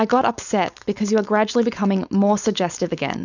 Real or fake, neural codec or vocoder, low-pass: fake; codec, 16 kHz, 4.8 kbps, FACodec; 7.2 kHz